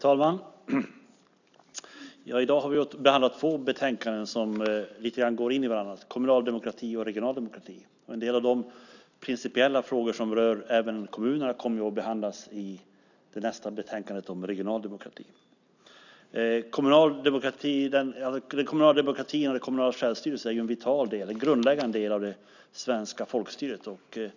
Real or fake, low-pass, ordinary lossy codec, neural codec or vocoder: real; 7.2 kHz; none; none